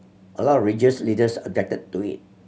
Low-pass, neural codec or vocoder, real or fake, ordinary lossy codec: none; none; real; none